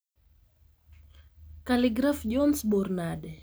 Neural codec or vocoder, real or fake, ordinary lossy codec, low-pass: none; real; none; none